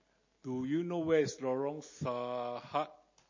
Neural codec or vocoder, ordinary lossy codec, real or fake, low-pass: none; MP3, 32 kbps; real; 7.2 kHz